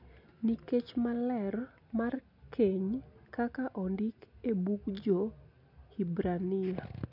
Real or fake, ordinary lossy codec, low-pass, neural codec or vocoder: real; none; 5.4 kHz; none